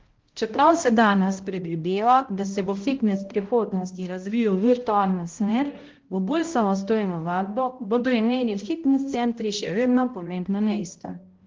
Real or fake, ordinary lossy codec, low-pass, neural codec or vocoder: fake; Opus, 16 kbps; 7.2 kHz; codec, 16 kHz, 0.5 kbps, X-Codec, HuBERT features, trained on balanced general audio